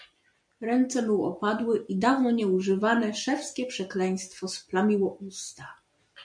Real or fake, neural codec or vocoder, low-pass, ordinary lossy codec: real; none; 9.9 kHz; MP3, 64 kbps